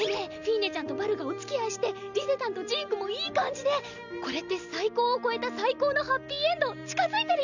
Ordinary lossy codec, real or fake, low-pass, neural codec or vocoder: none; real; 7.2 kHz; none